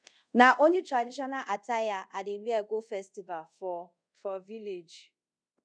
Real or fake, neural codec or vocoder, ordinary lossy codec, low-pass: fake; codec, 24 kHz, 0.5 kbps, DualCodec; none; 9.9 kHz